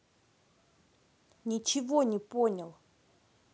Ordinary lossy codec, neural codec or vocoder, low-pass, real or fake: none; none; none; real